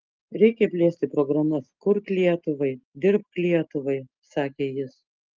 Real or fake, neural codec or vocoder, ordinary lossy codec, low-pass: real; none; Opus, 24 kbps; 7.2 kHz